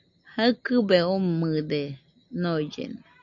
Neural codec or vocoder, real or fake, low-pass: none; real; 7.2 kHz